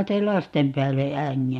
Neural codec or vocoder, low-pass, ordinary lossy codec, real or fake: none; 19.8 kHz; MP3, 64 kbps; real